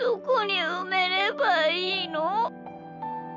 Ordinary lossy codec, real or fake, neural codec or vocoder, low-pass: none; real; none; 7.2 kHz